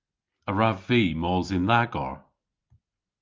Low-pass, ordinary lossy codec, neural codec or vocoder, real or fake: 7.2 kHz; Opus, 24 kbps; none; real